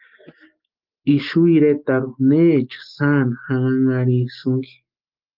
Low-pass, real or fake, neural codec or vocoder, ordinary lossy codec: 5.4 kHz; real; none; Opus, 32 kbps